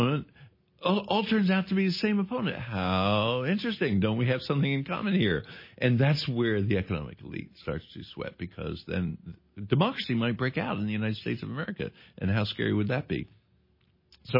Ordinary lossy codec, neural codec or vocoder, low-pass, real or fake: MP3, 24 kbps; none; 5.4 kHz; real